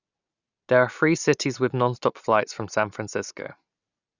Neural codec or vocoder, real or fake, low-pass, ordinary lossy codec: none; real; 7.2 kHz; none